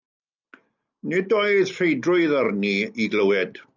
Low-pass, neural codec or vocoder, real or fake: 7.2 kHz; none; real